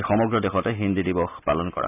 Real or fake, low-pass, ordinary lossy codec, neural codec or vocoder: real; 3.6 kHz; none; none